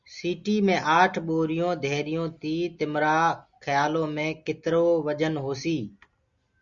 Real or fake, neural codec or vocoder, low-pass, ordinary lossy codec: real; none; 7.2 kHz; Opus, 64 kbps